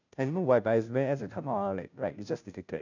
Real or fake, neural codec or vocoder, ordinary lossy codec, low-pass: fake; codec, 16 kHz, 0.5 kbps, FunCodec, trained on Chinese and English, 25 frames a second; none; 7.2 kHz